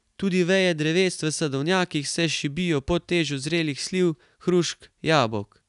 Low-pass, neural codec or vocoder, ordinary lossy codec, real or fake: 10.8 kHz; none; none; real